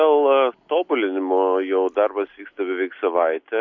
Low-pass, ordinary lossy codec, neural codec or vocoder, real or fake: 7.2 kHz; MP3, 48 kbps; none; real